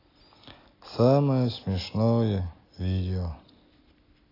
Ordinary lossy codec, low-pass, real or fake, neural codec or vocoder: AAC, 24 kbps; 5.4 kHz; real; none